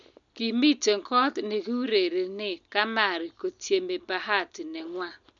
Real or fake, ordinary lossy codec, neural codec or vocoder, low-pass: real; none; none; 7.2 kHz